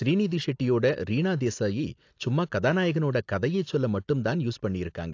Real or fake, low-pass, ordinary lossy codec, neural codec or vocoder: real; 7.2 kHz; AAC, 48 kbps; none